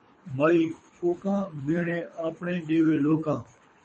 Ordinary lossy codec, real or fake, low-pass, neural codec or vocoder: MP3, 32 kbps; fake; 9.9 kHz; codec, 24 kHz, 3 kbps, HILCodec